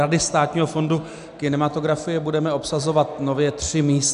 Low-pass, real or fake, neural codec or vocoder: 10.8 kHz; real; none